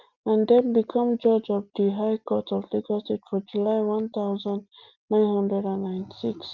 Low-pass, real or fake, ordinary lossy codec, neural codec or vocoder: 7.2 kHz; real; Opus, 24 kbps; none